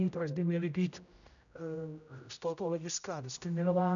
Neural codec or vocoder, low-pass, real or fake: codec, 16 kHz, 0.5 kbps, X-Codec, HuBERT features, trained on general audio; 7.2 kHz; fake